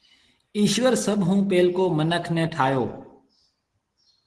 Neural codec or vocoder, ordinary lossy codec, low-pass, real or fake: none; Opus, 16 kbps; 10.8 kHz; real